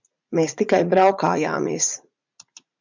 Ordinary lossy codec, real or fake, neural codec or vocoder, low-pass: MP3, 64 kbps; fake; vocoder, 44.1 kHz, 80 mel bands, Vocos; 7.2 kHz